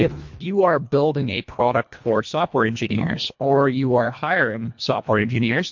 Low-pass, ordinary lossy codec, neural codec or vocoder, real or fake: 7.2 kHz; MP3, 48 kbps; codec, 24 kHz, 1.5 kbps, HILCodec; fake